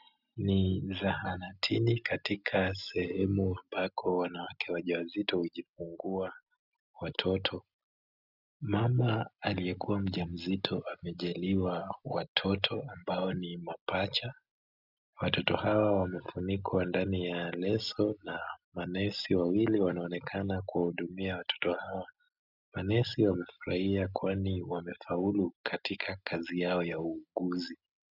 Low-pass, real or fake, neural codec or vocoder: 5.4 kHz; real; none